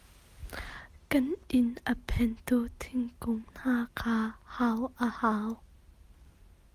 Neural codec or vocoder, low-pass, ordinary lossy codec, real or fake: none; 14.4 kHz; Opus, 32 kbps; real